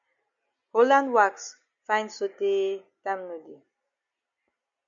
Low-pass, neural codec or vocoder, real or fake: 7.2 kHz; none; real